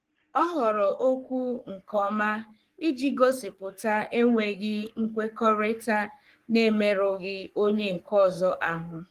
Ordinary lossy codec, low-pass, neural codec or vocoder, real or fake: Opus, 24 kbps; 14.4 kHz; codec, 44.1 kHz, 3.4 kbps, Pupu-Codec; fake